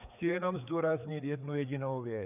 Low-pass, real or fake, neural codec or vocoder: 3.6 kHz; fake; codec, 16 kHz, 4 kbps, FreqCodec, larger model